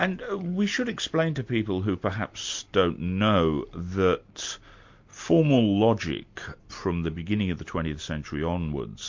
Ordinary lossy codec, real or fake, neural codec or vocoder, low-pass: MP3, 48 kbps; real; none; 7.2 kHz